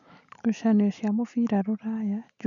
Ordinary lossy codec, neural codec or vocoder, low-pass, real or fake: none; none; 7.2 kHz; real